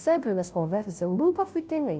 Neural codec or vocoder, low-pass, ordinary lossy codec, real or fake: codec, 16 kHz, 0.5 kbps, FunCodec, trained on Chinese and English, 25 frames a second; none; none; fake